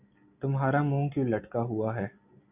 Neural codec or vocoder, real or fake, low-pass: none; real; 3.6 kHz